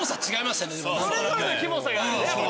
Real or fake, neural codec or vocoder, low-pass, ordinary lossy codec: real; none; none; none